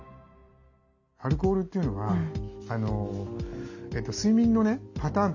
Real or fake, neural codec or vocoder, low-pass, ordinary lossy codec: real; none; 7.2 kHz; none